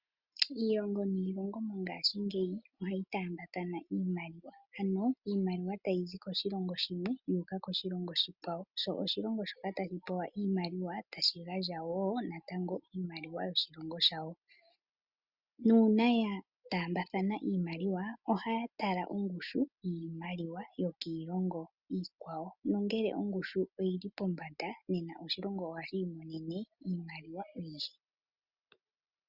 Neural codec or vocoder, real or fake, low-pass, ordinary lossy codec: none; real; 5.4 kHz; Opus, 64 kbps